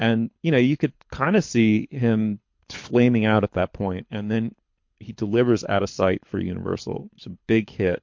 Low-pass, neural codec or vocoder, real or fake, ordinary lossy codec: 7.2 kHz; codec, 24 kHz, 6 kbps, HILCodec; fake; MP3, 48 kbps